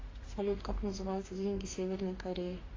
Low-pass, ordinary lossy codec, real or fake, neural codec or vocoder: 7.2 kHz; AAC, 32 kbps; fake; autoencoder, 48 kHz, 32 numbers a frame, DAC-VAE, trained on Japanese speech